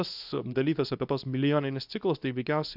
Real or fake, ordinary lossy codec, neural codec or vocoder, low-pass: fake; AAC, 48 kbps; codec, 24 kHz, 0.9 kbps, WavTokenizer, medium speech release version 2; 5.4 kHz